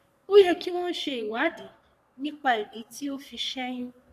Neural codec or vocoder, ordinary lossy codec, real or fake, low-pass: codec, 44.1 kHz, 3.4 kbps, Pupu-Codec; none; fake; 14.4 kHz